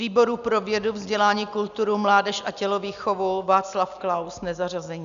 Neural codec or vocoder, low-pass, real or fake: none; 7.2 kHz; real